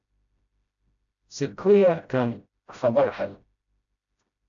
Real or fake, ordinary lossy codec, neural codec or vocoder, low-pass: fake; AAC, 64 kbps; codec, 16 kHz, 0.5 kbps, FreqCodec, smaller model; 7.2 kHz